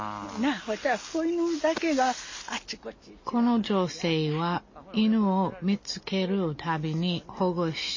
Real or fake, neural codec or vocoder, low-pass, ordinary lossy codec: real; none; 7.2 kHz; MP3, 32 kbps